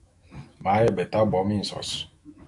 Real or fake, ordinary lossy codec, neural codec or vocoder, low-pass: fake; MP3, 64 kbps; autoencoder, 48 kHz, 128 numbers a frame, DAC-VAE, trained on Japanese speech; 10.8 kHz